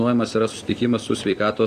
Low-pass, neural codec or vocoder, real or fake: 14.4 kHz; vocoder, 44.1 kHz, 128 mel bands every 256 samples, BigVGAN v2; fake